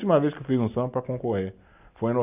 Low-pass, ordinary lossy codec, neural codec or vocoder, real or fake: 3.6 kHz; none; none; real